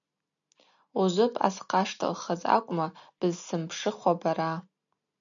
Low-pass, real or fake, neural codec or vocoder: 7.2 kHz; real; none